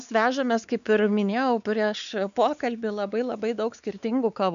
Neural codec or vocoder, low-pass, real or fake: codec, 16 kHz, 4 kbps, X-Codec, WavLM features, trained on Multilingual LibriSpeech; 7.2 kHz; fake